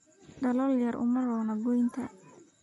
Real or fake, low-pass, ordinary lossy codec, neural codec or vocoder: real; 14.4 kHz; MP3, 48 kbps; none